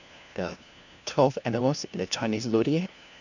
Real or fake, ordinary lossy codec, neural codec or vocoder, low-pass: fake; none; codec, 16 kHz, 1 kbps, FunCodec, trained on LibriTTS, 50 frames a second; 7.2 kHz